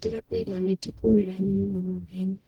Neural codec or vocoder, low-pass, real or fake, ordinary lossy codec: codec, 44.1 kHz, 0.9 kbps, DAC; 19.8 kHz; fake; Opus, 24 kbps